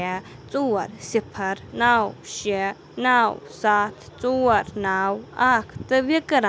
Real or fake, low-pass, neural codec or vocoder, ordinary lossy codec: real; none; none; none